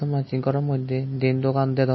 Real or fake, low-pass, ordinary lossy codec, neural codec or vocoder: real; 7.2 kHz; MP3, 24 kbps; none